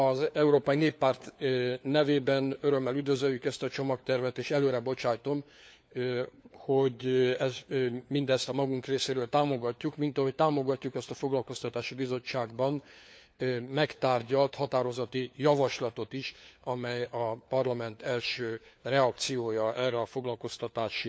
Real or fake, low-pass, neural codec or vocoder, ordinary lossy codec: fake; none; codec, 16 kHz, 4 kbps, FunCodec, trained on LibriTTS, 50 frames a second; none